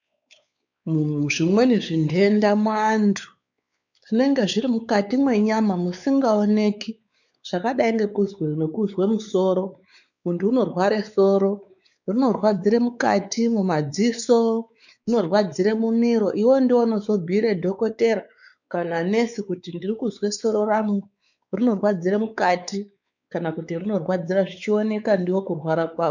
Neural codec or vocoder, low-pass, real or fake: codec, 16 kHz, 4 kbps, X-Codec, WavLM features, trained on Multilingual LibriSpeech; 7.2 kHz; fake